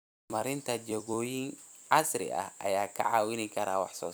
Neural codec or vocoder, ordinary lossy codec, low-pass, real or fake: vocoder, 44.1 kHz, 128 mel bands every 256 samples, BigVGAN v2; none; none; fake